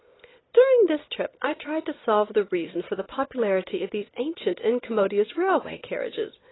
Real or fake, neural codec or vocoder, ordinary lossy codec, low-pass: real; none; AAC, 16 kbps; 7.2 kHz